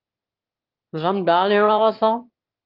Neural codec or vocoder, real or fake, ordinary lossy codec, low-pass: autoencoder, 22.05 kHz, a latent of 192 numbers a frame, VITS, trained on one speaker; fake; Opus, 32 kbps; 5.4 kHz